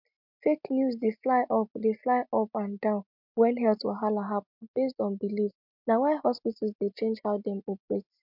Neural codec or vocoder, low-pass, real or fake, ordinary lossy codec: none; 5.4 kHz; real; MP3, 48 kbps